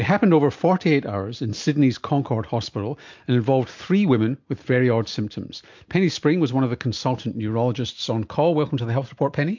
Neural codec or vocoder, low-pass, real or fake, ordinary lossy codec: none; 7.2 kHz; real; MP3, 48 kbps